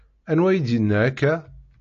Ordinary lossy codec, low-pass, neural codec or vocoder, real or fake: AAC, 48 kbps; 7.2 kHz; none; real